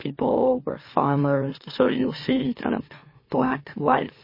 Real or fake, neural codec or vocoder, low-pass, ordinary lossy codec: fake; autoencoder, 44.1 kHz, a latent of 192 numbers a frame, MeloTTS; 5.4 kHz; MP3, 24 kbps